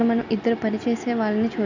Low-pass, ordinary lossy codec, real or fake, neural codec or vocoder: 7.2 kHz; none; real; none